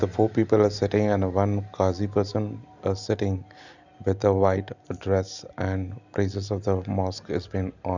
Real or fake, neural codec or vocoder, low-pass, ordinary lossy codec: real; none; 7.2 kHz; none